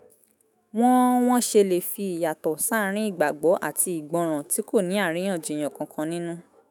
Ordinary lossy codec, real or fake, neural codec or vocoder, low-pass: none; fake; autoencoder, 48 kHz, 128 numbers a frame, DAC-VAE, trained on Japanese speech; none